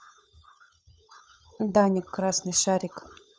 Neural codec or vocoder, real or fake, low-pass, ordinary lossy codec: codec, 16 kHz, 16 kbps, FunCodec, trained on LibriTTS, 50 frames a second; fake; none; none